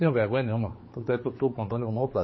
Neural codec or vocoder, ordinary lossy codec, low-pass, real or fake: codec, 16 kHz, 4 kbps, X-Codec, HuBERT features, trained on general audio; MP3, 24 kbps; 7.2 kHz; fake